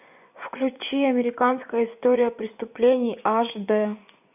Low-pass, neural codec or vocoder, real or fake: 3.6 kHz; vocoder, 24 kHz, 100 mel bands, Vocos; fake